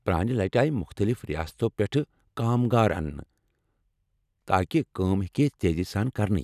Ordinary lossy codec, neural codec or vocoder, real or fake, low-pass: none; none; real; 14.4 kHz